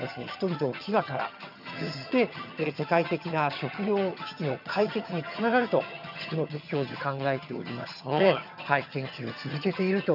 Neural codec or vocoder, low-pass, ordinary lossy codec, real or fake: vocoder, 22.05 kHz, 80 mel bands, HiFi-GAN; 5.4 kHz; none; fake